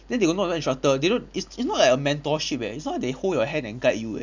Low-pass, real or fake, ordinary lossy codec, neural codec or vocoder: 7.2 kHz; real; none; none